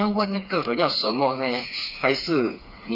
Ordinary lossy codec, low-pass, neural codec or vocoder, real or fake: none; 5.4 kHz; codec, 16 kHz, 4 kbps, FreqCodec, smaller model; fake